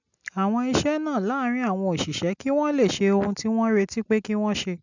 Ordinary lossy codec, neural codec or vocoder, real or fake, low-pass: none; none; real; 7.2 kHz